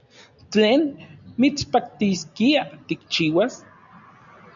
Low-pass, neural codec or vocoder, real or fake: 7.2 kHz; none; real